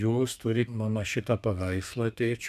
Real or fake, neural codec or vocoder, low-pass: fake; codec, 32 kHz, 1.9 kbps, SNAC; 14.4 kHz